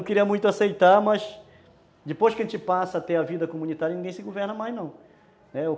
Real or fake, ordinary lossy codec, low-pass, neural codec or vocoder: real; none; none; none